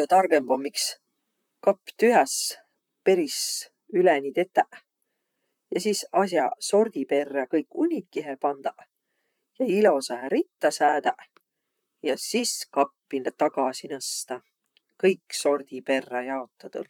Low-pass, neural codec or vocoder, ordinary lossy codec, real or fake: 19.8 kHz; vocoder, 44.1 kHz, 128 mel bands, Pupu-Vocoder; none; fake